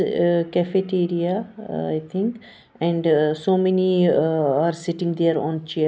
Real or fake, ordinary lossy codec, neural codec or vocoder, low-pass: real; none; none; none